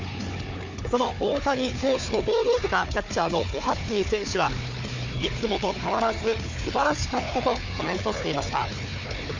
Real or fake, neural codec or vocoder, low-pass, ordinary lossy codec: fake; codec, 16 kHz, 4 kbps, FunCodec, trained on Chinese and English, 50 frames a second; 7.2 kHz; MP3, 64 kbps